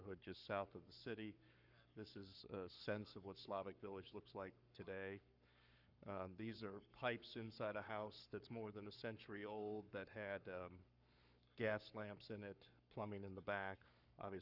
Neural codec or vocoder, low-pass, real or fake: codec, 44.1 kHz, 7.8 kbps, Pupu-Codec; 5.4 kHz; fake